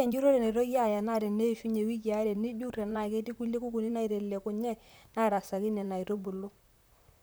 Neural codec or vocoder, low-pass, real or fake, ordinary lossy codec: vocoder, 44.1 kHz, 128 mel bands, Pupu-Vocoder; none; fake; none